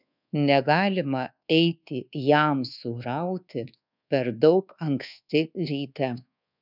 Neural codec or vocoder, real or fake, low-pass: codec, 24 kHz, 1.2 kbps, DualCodec; fake; 5.4 kHz